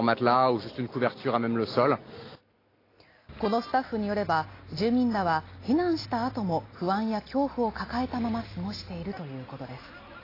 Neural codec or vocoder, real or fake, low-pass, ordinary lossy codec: none; real; 5.4 kHz; AAC, 24 kbps